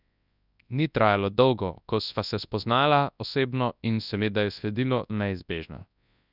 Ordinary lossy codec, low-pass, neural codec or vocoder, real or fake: none; 5.4 kHz; codec, 24 kHz, 0.9 kbps, WavTokenizer, large speech release; fake